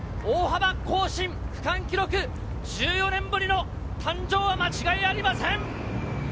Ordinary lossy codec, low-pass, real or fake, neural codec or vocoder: none; none; real; none